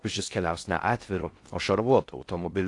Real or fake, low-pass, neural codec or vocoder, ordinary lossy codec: fake; 10.8 kHz; codec, 16 kHz in and 24 kHz out, 0.6 kbps, FocalCodec, streaming, 4096 codes; AAC, 48 kbps